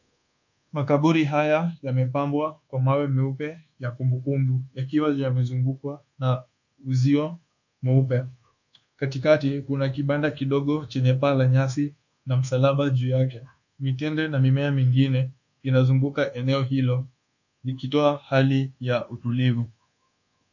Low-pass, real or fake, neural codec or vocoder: 7.2 kHz; fake; codec, 24 kHz, 1.2 kbps, DualCodec